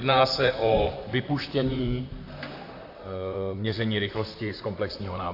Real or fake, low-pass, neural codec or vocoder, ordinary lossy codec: fake; 5.4 kHz; vocoder, 44.1 kHz, 128 mel bands, Pupu-Vocoder; AAC, 32 kbps